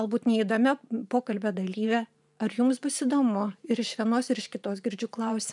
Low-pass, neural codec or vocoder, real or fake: 10.8 kHz; vocoder, 44.1 kHz, 128 mel bands every 512 samples, BigVGAN v2; fake